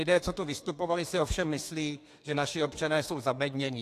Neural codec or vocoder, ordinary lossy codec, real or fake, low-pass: codec, 32 kHz, 1.9 kbps, SNAC; AAC, 64 kbps; fake; 14.4 kHz